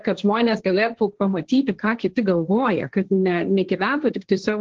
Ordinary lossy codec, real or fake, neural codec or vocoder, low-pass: Opus, 16 kbps; fake; codec, 16 kHz, 1.1 kbps, Voila-Tokenizer; 7.2 kHz